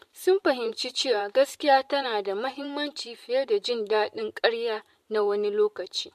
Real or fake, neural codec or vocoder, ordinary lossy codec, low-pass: fake; vocoder, 44.1 kHz, 128 mel bands every 512 samples, BigVGAN v2; MP3, 64 kbps; 14.4 kHz